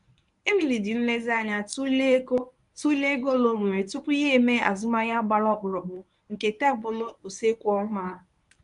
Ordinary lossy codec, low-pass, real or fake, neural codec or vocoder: none; 10.8 kHz; fake; codec, 24 kHz, 0.9 kbps, WavTokenizer, medium speech release version 1